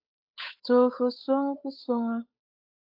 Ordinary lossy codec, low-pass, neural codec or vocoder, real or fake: AAC, 48 kbps; 5.4 kHz; codec, 16 kHz, 8 kbps, FunCodec, trained on Chinese and English, 25 frames a second; fake